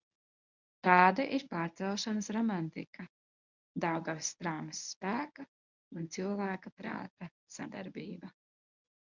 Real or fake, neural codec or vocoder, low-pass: fake; codec, 24 kHz, 0.9 kbps, WavTokenizer, medium speech release version 1; 7.2 kHz